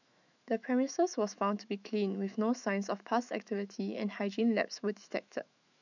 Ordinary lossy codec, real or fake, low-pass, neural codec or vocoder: none; fake; 7.2 kHz; autoencoder, 48 kHz, 128 numbers a frame, DAC-VAE, trained on Japanese speech